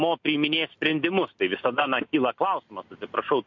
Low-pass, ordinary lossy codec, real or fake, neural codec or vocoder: 7.2 kHz; MP3, 48 kbps; real; none